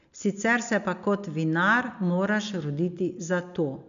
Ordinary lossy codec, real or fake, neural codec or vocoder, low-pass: MP3, 96 kbps; real; none; 7.2 kHz